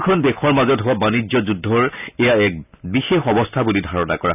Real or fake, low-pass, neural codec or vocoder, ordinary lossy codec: real; 3.6 kHz; none; none